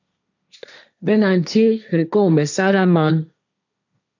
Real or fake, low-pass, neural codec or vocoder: fake; 7.2 kHz; codec, 16 kHz, 1.1 kbps, Voila-Tokenizer